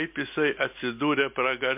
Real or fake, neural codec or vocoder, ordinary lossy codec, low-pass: real; none; MP3, 32 kbps; 3.6 kHz